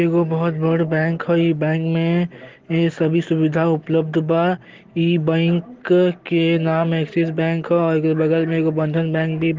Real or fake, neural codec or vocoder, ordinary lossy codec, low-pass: real; none; Opus, 16 kbps; 7.2 kHz